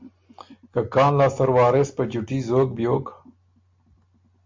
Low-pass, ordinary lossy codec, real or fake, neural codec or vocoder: 7.2 kHz; MP3, 48 kbps; real; none